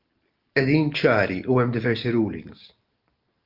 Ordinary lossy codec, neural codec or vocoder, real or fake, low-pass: Opus, 32 kbps; none; real; 5.4 kHz